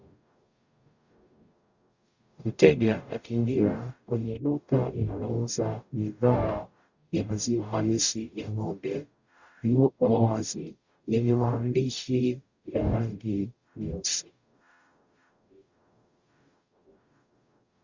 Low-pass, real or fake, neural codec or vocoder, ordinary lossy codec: 7.2 kHz; fake; codec, 44.1 kHz, 0.9 kbps, DAC; Opus, 64 kbps